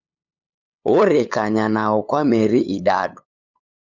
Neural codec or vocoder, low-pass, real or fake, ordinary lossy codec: codec, 16 kHz, 8 kbps, FunCodec, trained on LibriTTS, 25 frames a second; 7.2 kHz; fake; Opus, 64 kbps